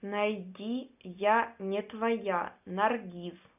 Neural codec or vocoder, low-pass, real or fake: none; 3.6 kHz; real